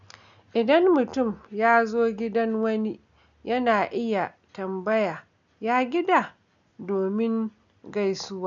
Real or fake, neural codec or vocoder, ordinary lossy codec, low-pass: real; none; none; 7.2 kHz